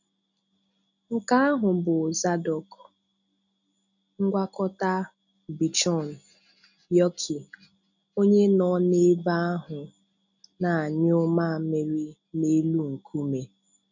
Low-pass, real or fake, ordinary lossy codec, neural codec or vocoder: 7.2 kHz; real; none; none